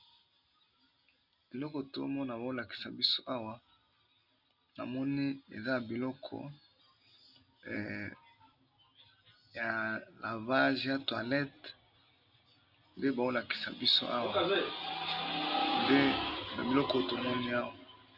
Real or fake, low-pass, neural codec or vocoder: real; 5.4 kHz; none